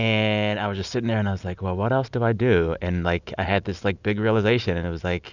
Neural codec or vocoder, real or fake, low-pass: none; real; 7.2 kHz